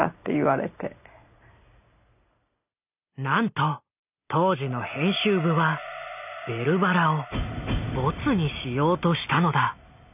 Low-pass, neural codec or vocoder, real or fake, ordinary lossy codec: 3.6 kHz; none; real; none